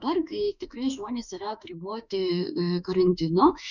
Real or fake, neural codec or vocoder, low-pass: fake; autoencoder, 48 kHz, 32 numbers a frame, DAC-VAE, trained on Japanese speech; 7.2 kHz